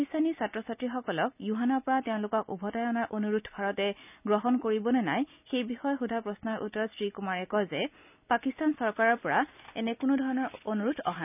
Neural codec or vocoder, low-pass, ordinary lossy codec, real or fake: none; 3.6 kHz; none; real